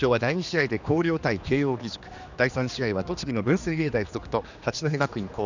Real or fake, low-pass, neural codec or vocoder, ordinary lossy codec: fake; 7.2 kHz; codec, 16 kHz, 2 kbps, X-Codec, HuBERT features, trained on general audio; none